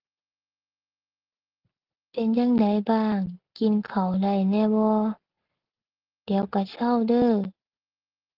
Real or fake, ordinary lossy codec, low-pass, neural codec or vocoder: real; Opus, 16 kbps; 5.4 kHz; none